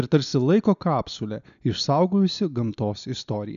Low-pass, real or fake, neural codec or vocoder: 7.2 kHz; real; none